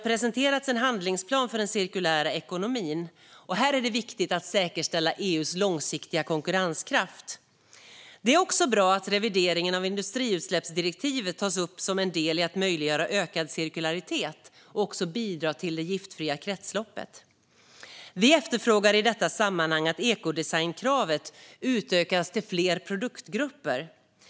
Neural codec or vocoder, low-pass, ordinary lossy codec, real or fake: none; none; none; real